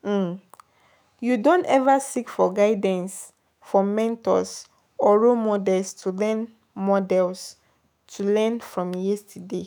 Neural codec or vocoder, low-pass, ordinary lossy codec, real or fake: autoencoder, 48 kHz, 128 numbers a frame, DAC-VAE, trained on Japanese speech; none; none; fake